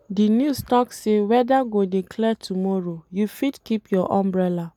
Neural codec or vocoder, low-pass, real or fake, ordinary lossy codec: none; 19.8 kHz; real; none